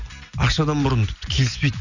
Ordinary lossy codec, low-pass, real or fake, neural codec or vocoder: none; 7.2 kHz; real; none